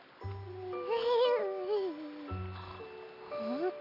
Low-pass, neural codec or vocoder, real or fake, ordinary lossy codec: 5.4 kHz; none; real; none